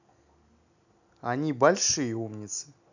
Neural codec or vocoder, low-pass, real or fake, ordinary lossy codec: none; 7.2 kHz; real; none